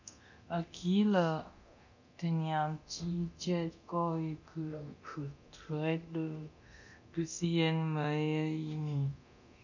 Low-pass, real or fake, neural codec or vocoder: 7.2 kHz; fake; codec, 24 kHz, 0.9 kbps, DualCodec